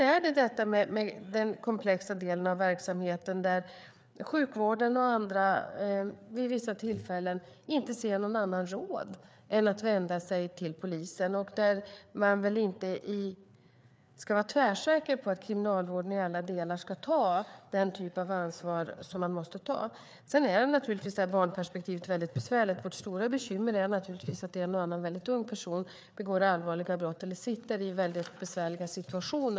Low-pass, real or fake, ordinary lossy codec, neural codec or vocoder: none; fake; none; codec, 16 kHz, 4 kbps, FreqCodec, larger model